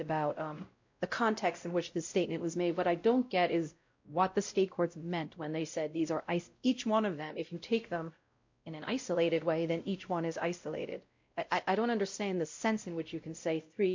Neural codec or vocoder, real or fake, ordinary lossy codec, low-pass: codec, 16 kHz, 0.5 kbps, X-Codec, WavLM features, trained on Multilingual LibriSpeech; fake; MP3, 48 kbps; 7.2 kHz